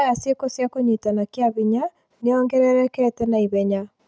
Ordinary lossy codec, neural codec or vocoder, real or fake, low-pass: none; none; real; none